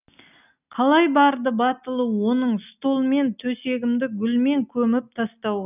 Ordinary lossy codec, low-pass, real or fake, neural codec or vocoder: none; 3.6 kHz; real; none